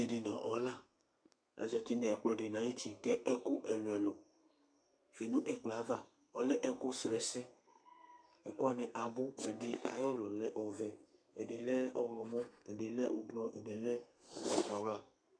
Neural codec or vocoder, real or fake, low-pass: codec, 32 kHz, 1.9 kbps, SNAC; fake; 9.9 kHz